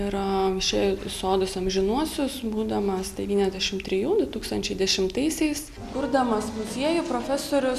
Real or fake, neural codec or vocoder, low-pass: real; none; 14.4 kHz